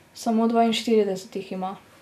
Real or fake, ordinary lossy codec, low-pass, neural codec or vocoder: real; none; 14.4 kHz; none